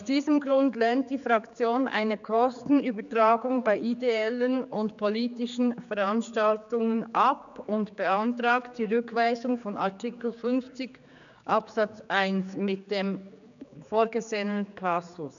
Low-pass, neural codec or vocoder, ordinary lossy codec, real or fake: 7.2 kHz; codec, 16 kHz, 4 kbps, X-Codec, HuBERT features, trained on general audio; none; fake